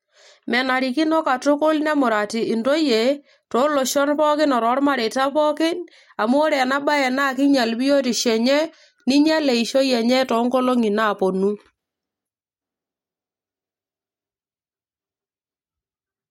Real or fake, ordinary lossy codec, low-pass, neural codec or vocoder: real; MP3, 64 kbps; 19.8 kHz; none